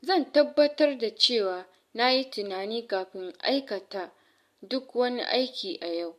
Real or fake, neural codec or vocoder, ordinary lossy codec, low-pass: real; none; MP3, 64 kbps; 14.4 kHz